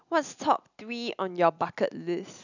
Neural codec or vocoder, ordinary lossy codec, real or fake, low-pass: none; none; real; 7.2 kHz